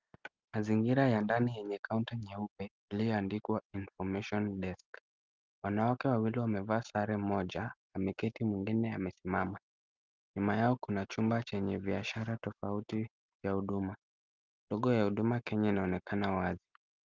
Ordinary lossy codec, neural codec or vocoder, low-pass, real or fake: Opus, 32 kbps; none; 7.2 kHz; real